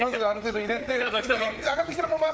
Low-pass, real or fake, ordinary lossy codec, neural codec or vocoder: none; fake; none; codec, 16 kHz, 4 kbps, FreqCodec, larger model